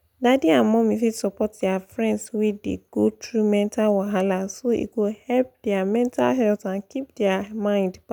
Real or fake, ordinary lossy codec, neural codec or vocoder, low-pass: real; none; none; 19.8 kHz